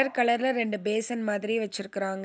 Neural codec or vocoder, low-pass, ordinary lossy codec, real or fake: none; none; none; real